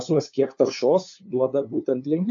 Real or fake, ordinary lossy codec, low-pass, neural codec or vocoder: fake; AAC, 64 kbps; 7.2 kHz; codec, 16 kHz, 2 kbps, FunCodec, trained on LibriTTS, 25 frames a second